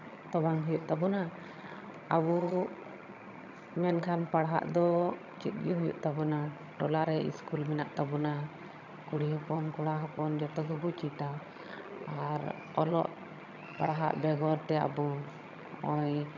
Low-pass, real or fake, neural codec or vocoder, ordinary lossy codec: 7.2 kHz; fake; vocoder, 22.05 kHz, 80 mel bands, HiFi-GAN; none